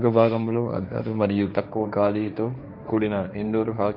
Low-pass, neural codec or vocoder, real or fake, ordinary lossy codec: 5.4 kHz; codec, 16 kHz, 1.1 kbps, Voila-Tokenizer; fake; none